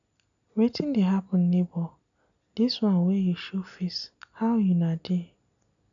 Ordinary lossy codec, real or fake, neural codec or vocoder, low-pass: none; real; none; 7.2 kHz